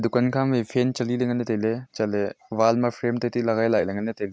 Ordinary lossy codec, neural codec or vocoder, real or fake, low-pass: none; none; real; none